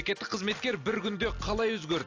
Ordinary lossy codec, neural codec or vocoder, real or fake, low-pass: AAC, 48 kbps; none; real; 7.2 kHz